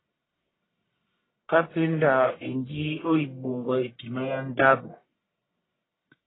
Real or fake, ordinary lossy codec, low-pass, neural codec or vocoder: fake; AAC, 16 kbps; 7.2 kHz; codec, 44.1 kHz, 1.7 kbps, Pupu-Codec